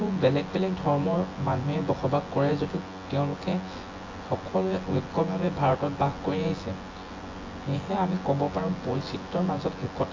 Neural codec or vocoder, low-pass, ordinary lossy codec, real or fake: vocoder, 24 kHz, 100 mel bands, Vocos; 7.2 kHz; AAC, 32 kbps; fake